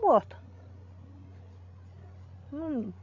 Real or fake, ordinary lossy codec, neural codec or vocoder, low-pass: fake; MP3, 48 kbps; codec, 16 kHz, 16 kbps, FreqCodec, larger model; 7.2 kHz